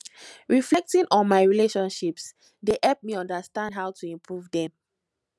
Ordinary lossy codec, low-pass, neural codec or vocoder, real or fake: none; none; none; real